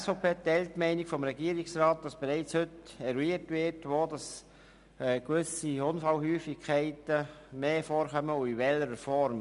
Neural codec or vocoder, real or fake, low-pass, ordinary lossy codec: none; real; 10.8 kHz; none